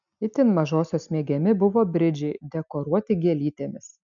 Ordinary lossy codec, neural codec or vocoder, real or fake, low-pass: MP3, 96 kbps; none; real; 7.2 kHz